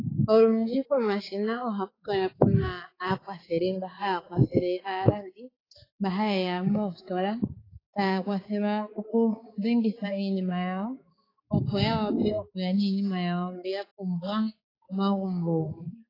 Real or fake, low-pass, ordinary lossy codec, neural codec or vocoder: fake; 5.4 kHz; AAC, 24 kbps; codec, 16 kHz, 4 kbps, X-Codec, HuBERT features, trained on balanced general audio